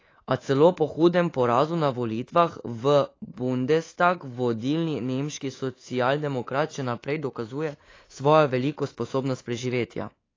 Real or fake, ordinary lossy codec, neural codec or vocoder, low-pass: fake; AAC, 32 kbps; codec, 24 kHz, 3.1 kbps, DualCodec; 7.2 kHz